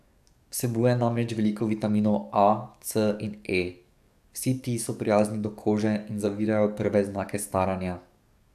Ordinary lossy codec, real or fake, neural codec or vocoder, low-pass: none; fake; codec, 44.1 kHz, 7.8 kbps, DAC; 14.4 kHz